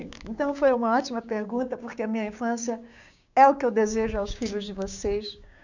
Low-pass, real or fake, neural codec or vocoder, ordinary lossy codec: 7.2 kHz; fake; codec, 16 kHz, 6 kbps, DAC; none